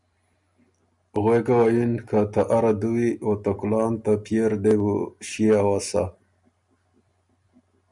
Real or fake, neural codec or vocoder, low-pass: real; none; 10.8 kHz